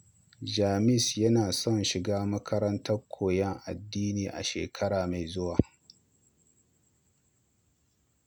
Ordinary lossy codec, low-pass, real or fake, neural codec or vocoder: none; none; real; none